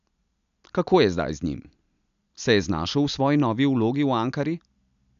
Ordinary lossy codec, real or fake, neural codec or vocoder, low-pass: none; real; none; 7.2 kHz